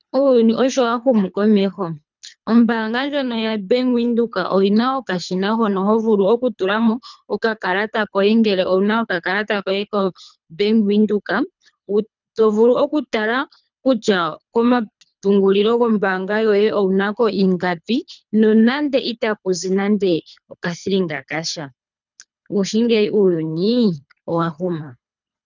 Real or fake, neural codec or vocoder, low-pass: fake; codec, 24 kHz, 3 kbps, HILCodec; 7.2 kHz